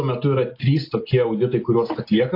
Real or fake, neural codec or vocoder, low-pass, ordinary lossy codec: real; none; 5.4 kHz; AAC, 32 kbps